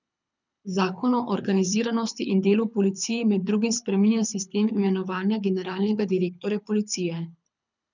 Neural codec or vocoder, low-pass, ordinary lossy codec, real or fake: codec, 24 kHz, 6 kbps, HILCodec; 7.2 kHz; none; fake